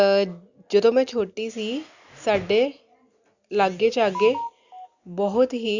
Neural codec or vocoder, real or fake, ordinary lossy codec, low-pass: none; real; Opus, 64 kbps; 7.2 kHz